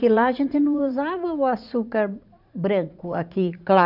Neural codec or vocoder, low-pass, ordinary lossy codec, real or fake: vocoder, 44.1 kHz, 80 mel bands, Vocos; 5.4 kHz; none; fake